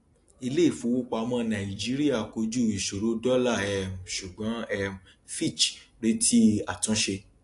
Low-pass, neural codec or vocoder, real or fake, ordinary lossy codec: 10.8 kHz; none; real; AAC, 48 kbps